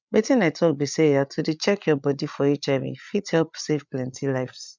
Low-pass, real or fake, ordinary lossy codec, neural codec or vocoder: 7.2 kHz; fake; none; codec, 16 kHz, 8 kbps, FreqCodec, larger model